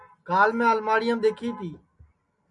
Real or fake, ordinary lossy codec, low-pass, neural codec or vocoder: real; MP3, 96 kbps; 10.8 kHz; none